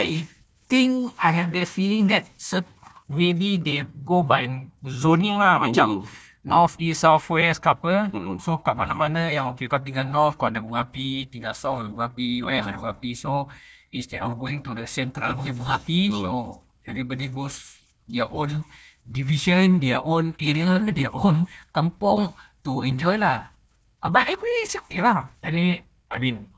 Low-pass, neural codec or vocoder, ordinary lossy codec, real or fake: none; codec, 16 kHz, 1 kbps, FunCodec, trained on Chinese and English, 50 frames a second; none; fake